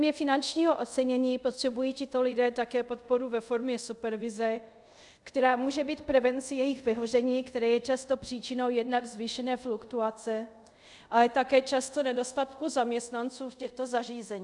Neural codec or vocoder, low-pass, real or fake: codec, 24 kHz, 0.5 kbps, DualCodec; 10.8 kHz; fake